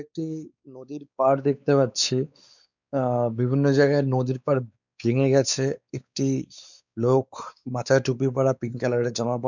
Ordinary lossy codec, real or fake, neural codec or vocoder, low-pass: none; fake; codec, 16 kHz, 4 kbps, X-Codec, WavLM features, trained on Multilingual LibriSpeech; 7.2 kHz